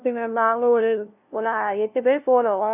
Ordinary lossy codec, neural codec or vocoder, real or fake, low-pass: none; codec, 16 kHz, 0.5 kbps, FunCodec, trained on LibriTTS, 25 frames a second; fake; 3.6 kHz